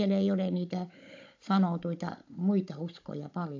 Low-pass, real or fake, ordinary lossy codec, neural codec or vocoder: 7.2 kHz; fake; AAC, 48 kbps; codec, 16 kHz, 16 kbps, FunCodec, trained on Chinese and English, 50 frames a second